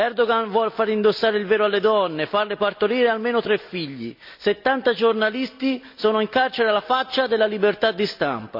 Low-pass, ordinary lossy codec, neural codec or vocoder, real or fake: 5.4 kHz; none; none; real